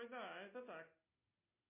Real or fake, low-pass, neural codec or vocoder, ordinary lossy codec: real; 3.6 kHz; none; MP3, 24 kbps